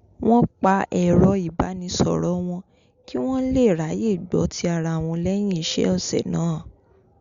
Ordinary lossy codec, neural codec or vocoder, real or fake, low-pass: Opus, 64 kbps; none; real; 7.2 kHz